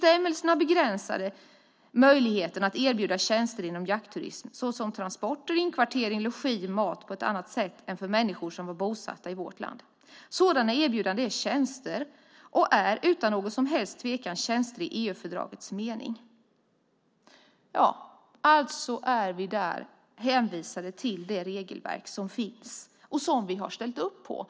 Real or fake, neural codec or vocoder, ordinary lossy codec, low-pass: real; none; none; none